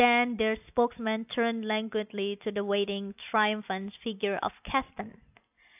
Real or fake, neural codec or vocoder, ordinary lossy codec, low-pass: real; none; AAC, 32 kbps; 3.6 kHz